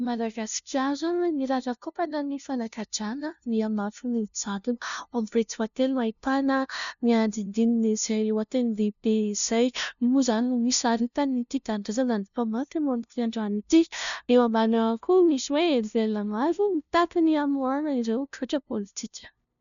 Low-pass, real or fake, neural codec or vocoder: 7.2 kHz; fake; codec, 16 kHz, 0.5 kbps, FunCodec, trained on LibriTTS, 25 frames a second